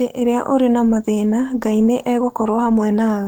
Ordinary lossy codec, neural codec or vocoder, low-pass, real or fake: Opus, 24 kbps; codec, 44.1 kHz, 7.8 kbps, DAC; 19.8 kHz; fake